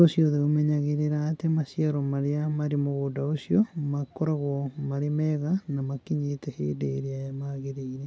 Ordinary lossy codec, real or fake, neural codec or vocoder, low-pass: none; real; none; none